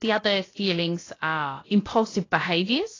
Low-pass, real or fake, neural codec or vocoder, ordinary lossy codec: 7.2 kHz; fake; codec, 16 kHz, about 1 kbps, DyCAST, with the encoder's durations; AAC, 32 kbps